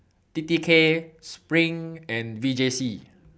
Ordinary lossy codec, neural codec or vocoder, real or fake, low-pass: none; none; real; none